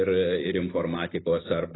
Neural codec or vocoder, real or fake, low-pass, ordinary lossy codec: none; real; 7.2 kHz; AAC, 16 kbps